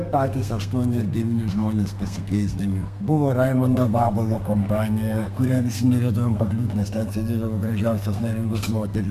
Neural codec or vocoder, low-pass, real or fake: codec, 32 kHz, 1.9 kbps, SNAC; 14.4 kHz; fake